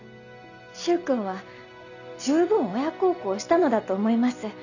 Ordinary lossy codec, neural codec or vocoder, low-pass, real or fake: none; none; 7.2 kHz; real